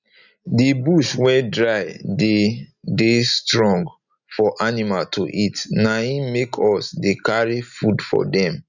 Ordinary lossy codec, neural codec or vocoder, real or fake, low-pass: none; none; real; 7.2 kHz